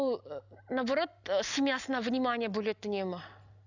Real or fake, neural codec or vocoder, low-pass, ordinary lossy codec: real; none; 7.2 kHz; none